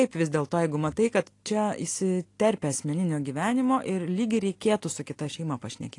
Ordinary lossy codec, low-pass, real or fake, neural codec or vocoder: AAC, 48 kbps; 10.8 kHz; real; none